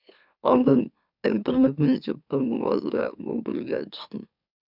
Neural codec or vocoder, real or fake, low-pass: autoencoder, 44.1 kHz, a latent of 192 numbers a frame, MeloTTS; fake; 5.4 kHz